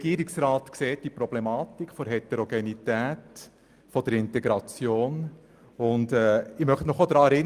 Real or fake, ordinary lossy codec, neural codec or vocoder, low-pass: real; Opus, 32 kbps; none; 14.4 kHz